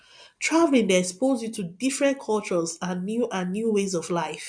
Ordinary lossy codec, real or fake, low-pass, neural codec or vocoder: none; real; 9.9 kHz; none